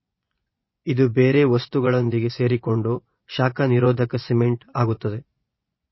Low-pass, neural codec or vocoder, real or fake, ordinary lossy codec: 7.2 kHz; vocoder, 24 kHz, 100 mel bands, Vocos; fake; MP3, 24 kbps